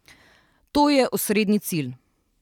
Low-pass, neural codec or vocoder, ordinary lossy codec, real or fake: 19.8 kHz; vocoder, 44.1 kHz, 128 mel bands every 512 samples, BigVGAN v2; none; fake